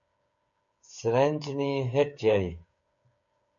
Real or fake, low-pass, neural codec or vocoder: fake; 7.2 kHz; codec, 16 kHz, 8 kbps, FreqCodec, smaller model